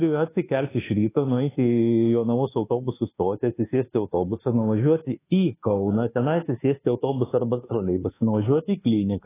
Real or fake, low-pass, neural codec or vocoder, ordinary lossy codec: fake; 3.6 kHz; codec, 24 kHz, 1.2 kbps, DualCodec; AAC, 16 kbps